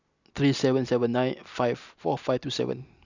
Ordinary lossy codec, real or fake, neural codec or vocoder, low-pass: none; real; none; 7.2 kHz